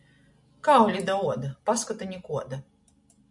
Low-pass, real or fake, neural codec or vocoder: 10.8 kHz; real; none